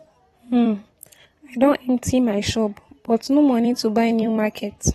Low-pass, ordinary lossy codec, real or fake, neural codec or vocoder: 19.8 kHz; AAC, 32 kbps; fake; vocoder, 44.1 kHz, 128 mel bands every 256 samples, BigVGAN v2